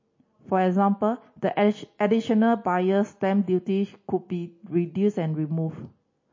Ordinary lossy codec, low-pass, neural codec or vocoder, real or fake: MP3, 32 kbps; 7.2 kHz; none; real